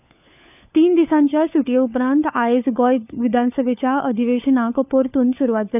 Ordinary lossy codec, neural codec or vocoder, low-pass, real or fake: none; codec, 16 kHz, 4 kbps, FunCodec, trained on LibriTTS, 50 frames a second; 3.6 kHz; fake